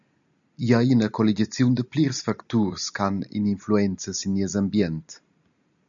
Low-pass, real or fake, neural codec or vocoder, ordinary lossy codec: 7.2 kHz; real; none; MP3, 96 kbps